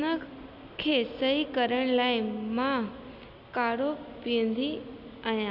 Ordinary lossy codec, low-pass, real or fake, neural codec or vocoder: none; 5.4 kHz; real; none